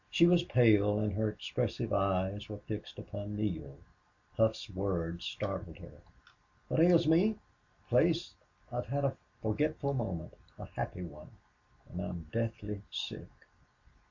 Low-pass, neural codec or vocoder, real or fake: 7.2 kHz; none; real